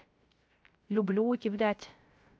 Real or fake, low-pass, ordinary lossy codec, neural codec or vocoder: fake; none; none; codec, 16 kHz, 0.3 kbps, FocalCodec